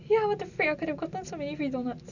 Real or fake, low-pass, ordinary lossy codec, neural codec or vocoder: real; 7.2 kHz; none; none